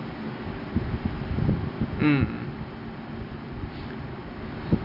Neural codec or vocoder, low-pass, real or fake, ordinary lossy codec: none; 5.4 kHz; real; none